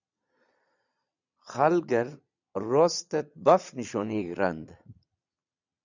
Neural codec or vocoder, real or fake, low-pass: vocoder, 22.05 kHz, 80 mel bands, Vocos; fake; 7.2 kHz